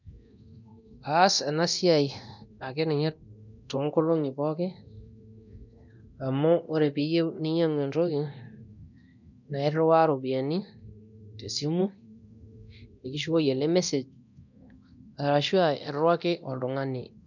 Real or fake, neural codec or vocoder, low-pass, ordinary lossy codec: fake; codec, 24 kHz, 0.9 kbps, DualCodec; 7.2 kHz; none